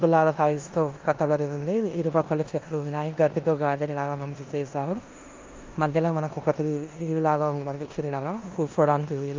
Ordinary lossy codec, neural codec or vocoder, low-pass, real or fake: Opus, 24 kbps; codec, 16 kHz in and 24 kHz out, 0.9 kbps, LongCat-Audio-Codec, four codebook decoder; 7.2 kHz; fake